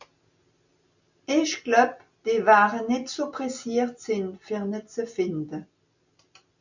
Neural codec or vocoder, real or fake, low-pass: none; real; 7.2 kHz